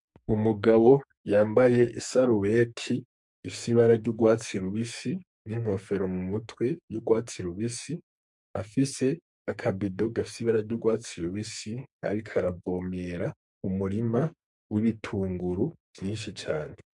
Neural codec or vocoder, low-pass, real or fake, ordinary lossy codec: codec, 44.1 kHz, 2.6 kbps, SNAC; 10.8 kHz; fake; MP3, 64 kbps